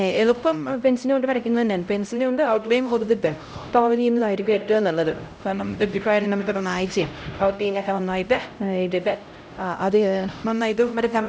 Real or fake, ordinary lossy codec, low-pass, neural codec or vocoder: fake; none; none; codec, 16 kHz, 0.5 kbps, X-Codec, HuBERT features, trained on LibriSpeech